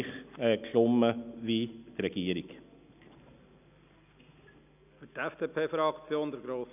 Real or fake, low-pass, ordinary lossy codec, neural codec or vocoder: real; 3.6 kHz; none; none